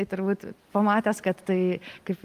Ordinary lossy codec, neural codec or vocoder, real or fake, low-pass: Opus, 32 kbps; none; real; 14.4 kHz